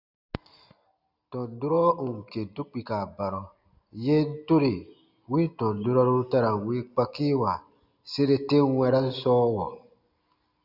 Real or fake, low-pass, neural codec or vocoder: real; 5.4 kHz; none